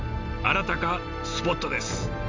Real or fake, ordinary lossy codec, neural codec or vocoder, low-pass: real; MP3, 48 kbps; none; 7.2 kHz